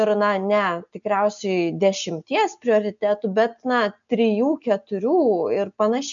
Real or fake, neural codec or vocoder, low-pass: real; none; 7.2 kHz